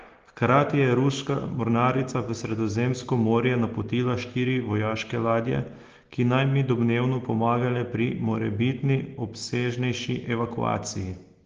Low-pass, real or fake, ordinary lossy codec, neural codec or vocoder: 7.2 kHz; real; Opus, 16 kbps; none